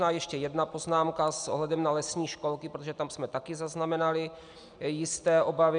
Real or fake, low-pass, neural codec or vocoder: real; 9.9 kHz; none